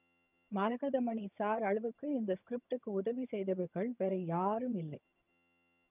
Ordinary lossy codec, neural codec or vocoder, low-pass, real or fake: none; vocoder, 22.05 kHz, 80 mel bands, HiFi-GAN; 3.6 kHz; fake